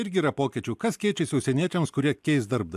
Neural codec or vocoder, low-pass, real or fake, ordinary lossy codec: none; 14.4 kHz; real; AAC, 96 kbps